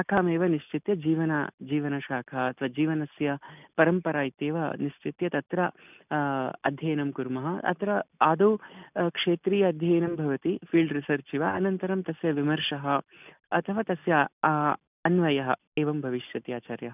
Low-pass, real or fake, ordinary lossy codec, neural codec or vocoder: 3.6 kHz; real; none; none